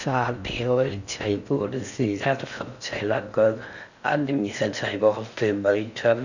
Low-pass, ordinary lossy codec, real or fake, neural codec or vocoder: 7.2 kHz; none; fake; codec, 16 kHz in and 24 kHz out, 0.6 kbps, FocalCodec, streaming, 4096 codes